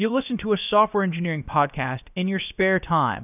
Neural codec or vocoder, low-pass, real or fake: codec, 16 kHz, about 1 kbps, DyCAST, with the encoder's durations; 3.6 kHz; fake